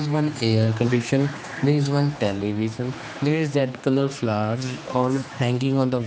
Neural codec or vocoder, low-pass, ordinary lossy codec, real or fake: codec, 16 kHz, 2 kbps, X-Codec, HuBERT features, trained on general audio; none; none; fake